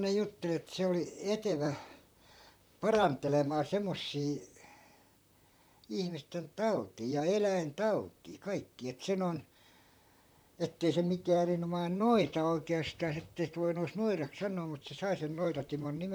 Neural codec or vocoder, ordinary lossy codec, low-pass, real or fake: vocoder, 44.1 kHz, 128 mel bands, Pupu-Vocoder; none; none; fake